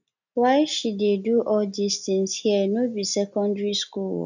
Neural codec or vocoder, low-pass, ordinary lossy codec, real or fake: none; 7.2 kHz; none; real